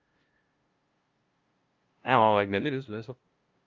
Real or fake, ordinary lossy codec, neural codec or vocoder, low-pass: fake; Opus, 32 kbps; codec, 16 kHz, 0.5 kbps, FunCodec, trained on LibriTTS, 25 frames a second; 7.2 kHz